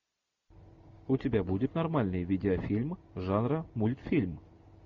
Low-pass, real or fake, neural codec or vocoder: 7.2 kHz; real; none